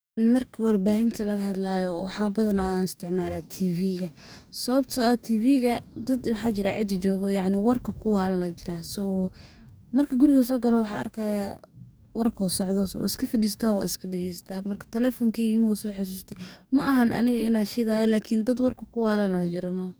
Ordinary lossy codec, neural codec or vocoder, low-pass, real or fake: none; codec, 44.1 kHz, 2.6 kbps, DAC; none; fake